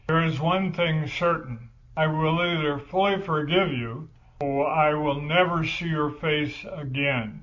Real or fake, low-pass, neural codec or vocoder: real; 7.2 kHz; none